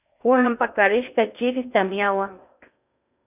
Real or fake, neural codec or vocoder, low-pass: fake; codec, 16 kHz in and 24 kHz out, 0.8 kbps, FocalCodec, streaming, 65536 codes; 3.6 kHz